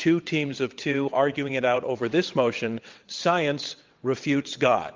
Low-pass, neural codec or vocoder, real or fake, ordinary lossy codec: 7.2 kHz; vocoder, 22.05 kHz, 80 mel bands, WaveNeXt; fake; Opus, 32 kbps